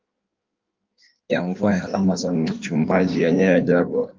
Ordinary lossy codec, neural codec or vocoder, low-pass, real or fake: Opus, 32 kbps; codec, 16 kHz in and 24 kHz out, 1.1 kbps, FireRedTTS-2 codec; 7.2 kHz; fake